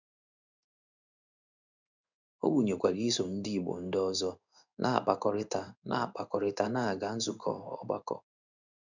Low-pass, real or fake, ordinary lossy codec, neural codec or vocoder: 7.2 kHz; fake; none; codec, 16 kHz in and 24 kHz out, 1 kbps, XY-Tokenizer